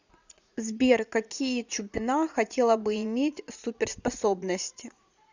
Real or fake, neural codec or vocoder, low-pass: fake; vocoder, 44.1 kHz, 80 mel bands, Vocos; 7.2 kHz